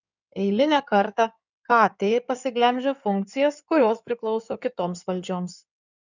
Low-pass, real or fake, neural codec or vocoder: 7.2 kHz; fake; codec, 16 kHz in and 24 kHz out, 2.2 kbps, FireRedTTS-2 codec